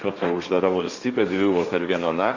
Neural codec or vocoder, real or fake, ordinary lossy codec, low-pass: codec, 16 kHz, 1.1 kbps, Voila-Tokenizer; fake; Opus, 64 kbps; 7.2 kHz